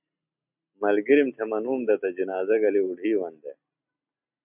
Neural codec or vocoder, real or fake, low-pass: none; real; 3.6 kHz